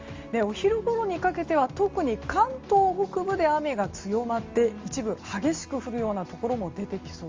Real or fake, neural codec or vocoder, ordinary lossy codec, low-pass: real; none; Opus, 32 kbps; 7.2 kHz